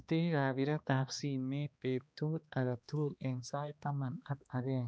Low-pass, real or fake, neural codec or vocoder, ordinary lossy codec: none; fake; codec, 16 kHz, 2 kbps, X-Codec, HuBERT features, trained on balanced general audio; none